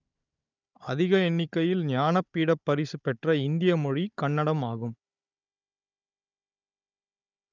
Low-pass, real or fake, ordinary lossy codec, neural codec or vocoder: 7.2 kHz; fake; none; codec, 16 kHz, 16 kbps, FunCodec, trained on Chinese and English, 50 frames a second